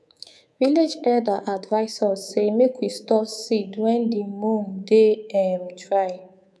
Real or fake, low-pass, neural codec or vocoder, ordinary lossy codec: fake; none; codec, 24 kHz, 3.1 kbps, DualCodec; none